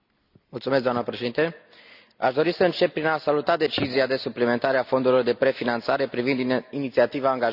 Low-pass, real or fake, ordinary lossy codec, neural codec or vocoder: 5.4 kHz; real; none; none